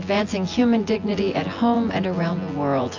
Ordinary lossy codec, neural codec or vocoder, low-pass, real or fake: AAC, 48 kbps; vocoder, 24 kHz, 100 mel bands, Vocos; 7.2 kHz; fake